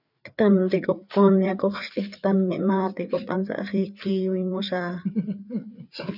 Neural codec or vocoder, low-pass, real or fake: codec, 16 kHz, 4 kbps, FreqCodec, larger model; 5.4 kHz; fake